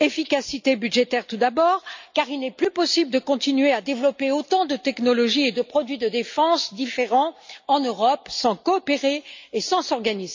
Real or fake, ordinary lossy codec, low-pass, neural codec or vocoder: real; MP3, 64 kbps; 7.2 kHz; none